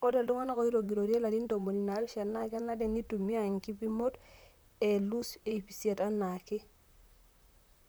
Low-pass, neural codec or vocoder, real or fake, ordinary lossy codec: none; vocoder, 44.1 kHz, 128 mel bands, Pupu-Vocoder; fake; none